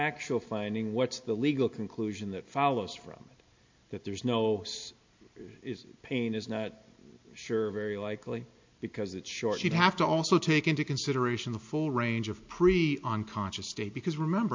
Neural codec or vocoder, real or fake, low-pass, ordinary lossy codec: none; real; 7.2 kHz; MP3, 48 kbps